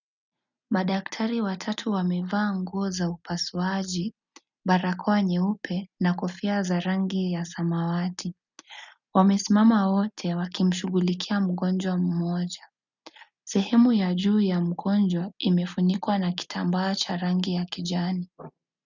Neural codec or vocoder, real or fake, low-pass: none; real; 7.2 kHz